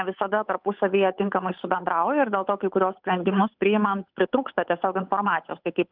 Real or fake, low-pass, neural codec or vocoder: fake; 5.4 kHz; codec, 16 kHz, 8 kbps, FunCodec, trained on Chinese and English, 25 frames a second